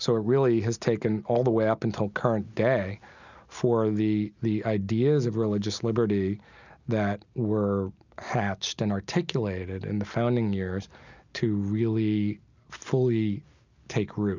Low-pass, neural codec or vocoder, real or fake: 7.2 kHz; none; real